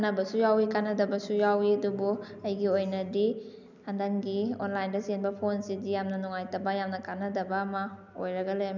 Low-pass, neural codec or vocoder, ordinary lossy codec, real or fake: 7.2 kHz; none; none; real